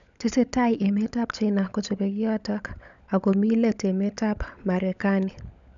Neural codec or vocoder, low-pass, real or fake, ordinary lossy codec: codec, 16 kHz, 16 kbps, FunCodec, trained on LibriTTS, 50 frames a second; 7.2 kHz; fake; none